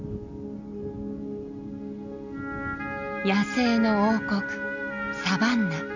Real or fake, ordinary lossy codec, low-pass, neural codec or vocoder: real; none; 7.2 kHz; none